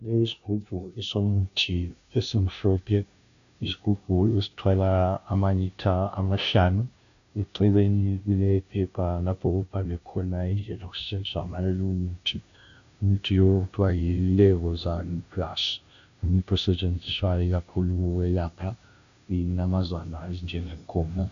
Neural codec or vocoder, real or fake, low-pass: codec, 16 kHz, 0.5 kbps, FunCodec, trained on Chinese and English, 25 frames a second; fake; 7.2 kHz